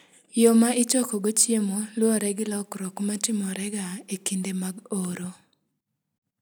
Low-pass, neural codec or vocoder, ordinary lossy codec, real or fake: none; none; none; real